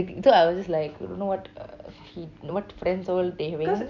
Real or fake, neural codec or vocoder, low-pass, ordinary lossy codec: real; none; 7.2 kHz; none